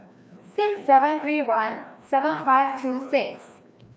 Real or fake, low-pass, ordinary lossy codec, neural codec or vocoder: fake; none; none; codec, 16 kHz, 1 kbps, FreqCodec, larger model